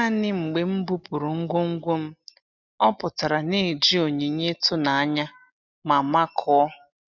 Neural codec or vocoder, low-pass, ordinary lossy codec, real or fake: none; 7.2 kHz; none; real